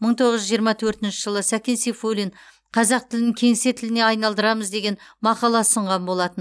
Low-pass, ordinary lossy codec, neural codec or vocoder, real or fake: none; none; none; real